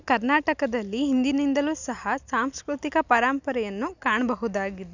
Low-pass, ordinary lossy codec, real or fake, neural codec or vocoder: 7.2 kHz; none; real; none